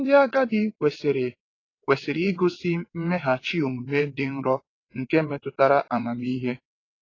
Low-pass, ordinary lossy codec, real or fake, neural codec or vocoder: 7.2 kHz; AAC, 32 kbps; fake; vocoder, 22.05 kHz, 80 mel bands, WaveNeXt